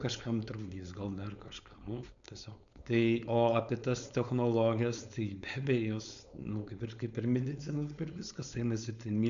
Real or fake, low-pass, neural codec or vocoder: fake; 7.2 kHz; codec, 16 kHz, 4.8 kbps, FACodec